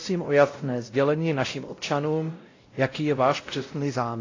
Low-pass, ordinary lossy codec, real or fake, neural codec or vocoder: 7.2 kHz; AAC, 32 kbps; fake; codec, 16 kHz, 0.5 kbps, X-Codec, WavLM features, trained on Multilingual LibriSpeech